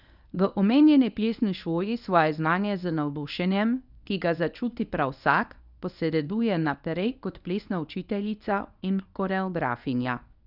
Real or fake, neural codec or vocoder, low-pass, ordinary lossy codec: fake; codec, 24 kHz, 0.9 kbps, WavTokenizer, medium speech release version 1; 5.4 kHz; none